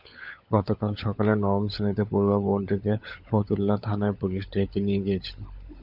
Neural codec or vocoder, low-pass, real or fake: codec, 16 kHz, 16 kbps, FunCodec, trained on LibriTTS, 50 frames a second; 5.4 kHz; fake